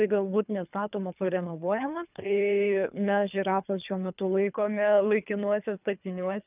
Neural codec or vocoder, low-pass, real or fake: codec, 24 kHz, 3 kbps, HILCodec; 3.6 kHz; fake